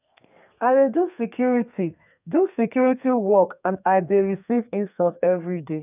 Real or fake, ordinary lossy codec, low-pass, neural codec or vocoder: fake; none; 3.6 kHz; codec, 32 kHz, 1.9 kbps, SNAC